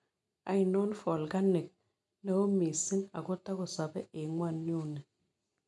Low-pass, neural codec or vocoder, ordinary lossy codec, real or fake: 10.8 kHz; none; none; real